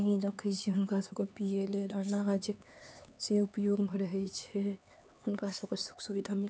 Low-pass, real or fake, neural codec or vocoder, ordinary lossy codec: none; fake; codec, 16 kHz, 4 kbps, X-Codec, HuBERT features, trained on LibriSpeech; none